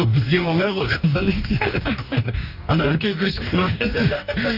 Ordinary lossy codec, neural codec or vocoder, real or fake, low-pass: none; codec, 44.1 kHz, 2.6 kbps, DAC; fake; 5.4 kHz